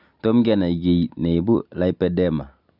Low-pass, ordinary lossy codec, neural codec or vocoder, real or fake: 5.4 kHz; none; none; real